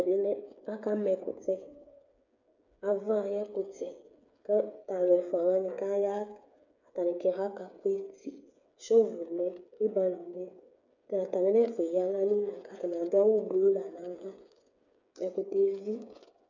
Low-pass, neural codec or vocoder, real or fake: 7.2 kHz; codec, 16 kHz, 8 kbps, FreqCodec, smaller model; fake